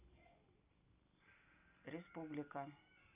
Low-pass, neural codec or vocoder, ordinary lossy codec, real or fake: 3.6 kHz; none; none; real